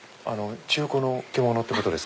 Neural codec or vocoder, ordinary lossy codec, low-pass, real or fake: none; none; none; real